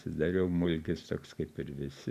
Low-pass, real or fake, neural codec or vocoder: 14.4 kHz; real; none